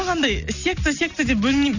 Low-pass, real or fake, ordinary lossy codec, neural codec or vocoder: 7.2 kHz; real; none; none